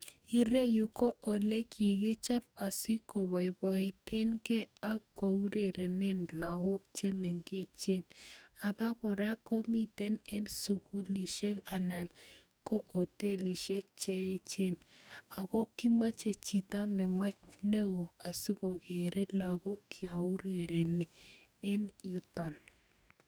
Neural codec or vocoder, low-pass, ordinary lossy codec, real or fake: codec, 44.1 kHz, 2.6 kbps, DAC; none; none; fake